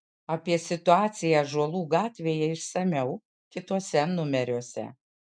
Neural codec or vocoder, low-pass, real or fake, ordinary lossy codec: none; 9.9 kHz; real; MP3, 96 kbps